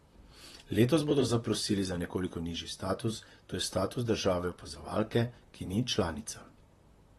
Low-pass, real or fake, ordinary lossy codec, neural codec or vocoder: 19.8 kHz; fake; AAC, 32 kbps; vocoder, 44.1 kHz, 128 mel bands, Pupu-Vocoder